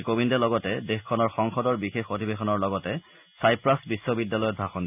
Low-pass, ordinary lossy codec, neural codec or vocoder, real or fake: 3.6 kHz; none; none; real